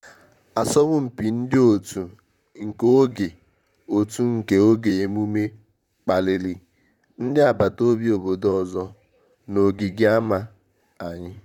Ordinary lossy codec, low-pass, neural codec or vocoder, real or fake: none; 19.8 kHz; vocoder, 44.1 kHz, 128 mel bands, Pupu-Vocoder; fake